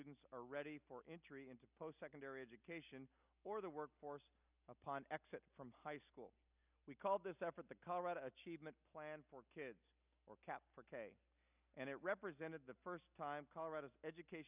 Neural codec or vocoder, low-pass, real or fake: none; 3.6 kHz; real